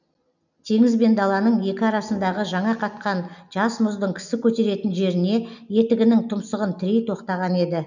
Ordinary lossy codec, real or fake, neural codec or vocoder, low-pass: none; real; none; 7.2 kHz